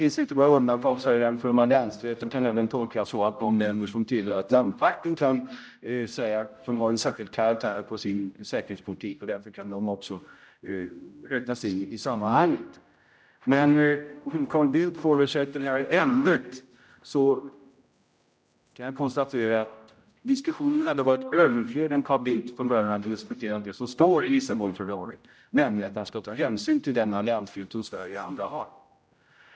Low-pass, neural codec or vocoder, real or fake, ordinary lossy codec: none; codec, 16 kHz, 0.5 kbps, X-Codec, HuBERT features, trained on general audio; fake; none